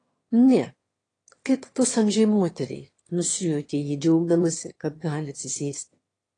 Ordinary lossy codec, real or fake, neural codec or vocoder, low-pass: AAC, 32 kbps; fake; autoencoder, 22.05 kHz, a latent of 192 numbers a frame, VITS, trained on one speaker; 9.9 kHz